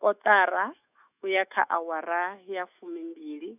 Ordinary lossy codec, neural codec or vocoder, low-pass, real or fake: none; autoencoder, 48 kHz, 128 numbers a frame, DAC-VAE, trained on Japanese speech; 3.6 kHz; fake